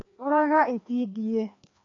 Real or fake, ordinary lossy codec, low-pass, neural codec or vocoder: fake; AAC, 48 kbps; 7.2 kHz; codec, 16 kHz, 2 kbps, FreqCodec, larger model